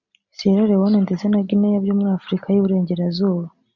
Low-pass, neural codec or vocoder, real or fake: 7.2 kHz; none; real